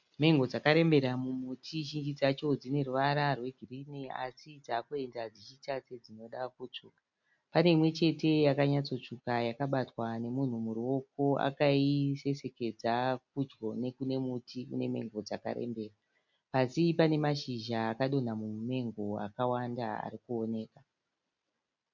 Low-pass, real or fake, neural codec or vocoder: 7.2 kHz; real; none